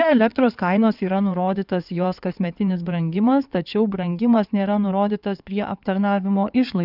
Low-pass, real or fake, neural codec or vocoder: 5.4 kHz; fake; codec, 16 kHz in and 24 kHz out, 2.2 kbps, FireRedTTS-2 codec